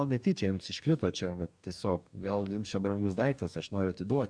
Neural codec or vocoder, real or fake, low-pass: codec, 44.1 kHz, 2.6 kbps, DAC; fake; 9.9 kHz